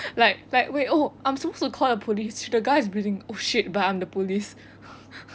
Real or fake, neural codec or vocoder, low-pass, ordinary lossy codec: real; none; none; none